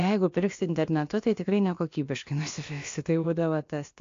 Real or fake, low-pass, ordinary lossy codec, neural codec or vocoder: fake; 7.2 kHz; AAC, 48 kbps; codec, 16 kHz, about 1 kbps, DyCAST, with the encoder's durations